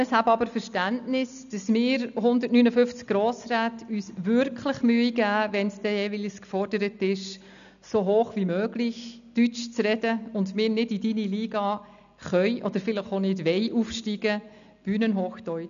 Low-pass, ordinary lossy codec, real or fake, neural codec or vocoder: 7.2 kHz; none; real; none